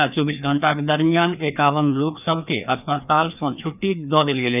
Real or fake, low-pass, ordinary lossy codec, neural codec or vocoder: fake; 3.6 kHz; none; codec, 16 kHz, 2 kbps, FreqCodec, larger model